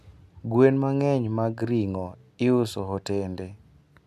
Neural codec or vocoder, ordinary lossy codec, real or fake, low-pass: none; none; real; 14.4 kHz